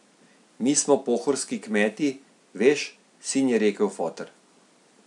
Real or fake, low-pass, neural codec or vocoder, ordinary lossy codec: real; 10.8 kHz; none; none